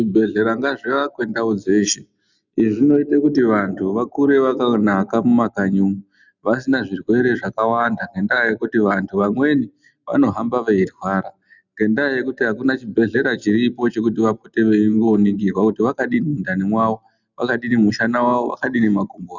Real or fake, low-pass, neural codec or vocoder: real; 7.2 kHz; none